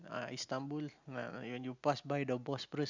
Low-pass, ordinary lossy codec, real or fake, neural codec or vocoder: 7.2 kHz; Opus, 64 kbps; real; none